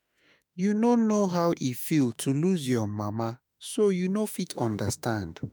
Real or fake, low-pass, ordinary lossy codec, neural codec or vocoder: fake; none; none; autoencoder, 48 kHz, 32 numbers a frame, DAC-VAE, trained on Japanese speech